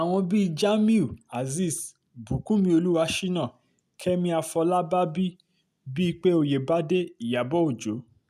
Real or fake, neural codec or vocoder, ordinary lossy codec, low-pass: real; none; none; 14.4 kHz